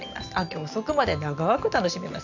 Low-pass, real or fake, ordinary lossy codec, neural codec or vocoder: 7.2 kHz; real; none; none